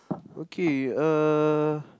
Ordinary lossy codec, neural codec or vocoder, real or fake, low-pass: none; none; real; none